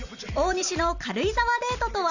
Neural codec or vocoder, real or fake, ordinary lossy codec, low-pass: none; real; none; 7.2 kHz